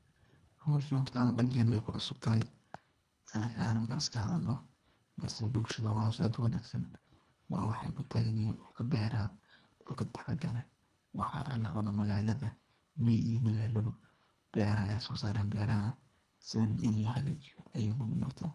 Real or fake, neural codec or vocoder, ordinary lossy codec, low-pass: fake; codec, 24 kHz, 1.5 kbps, HILCodec; none; none